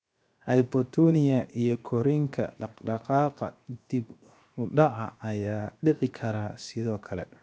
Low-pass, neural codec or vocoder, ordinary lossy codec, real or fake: none; codec, 16 kHz, 0.7 kbps, FocalCodec; none; fake